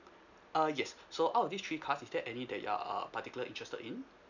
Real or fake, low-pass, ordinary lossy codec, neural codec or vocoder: real; 7.2 kHz; none; none